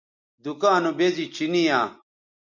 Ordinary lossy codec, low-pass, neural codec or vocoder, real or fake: MP3, 64 kbps; 7.2 kHz; none; real